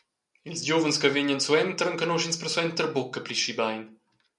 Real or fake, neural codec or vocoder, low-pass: real; none; 10.8 kHz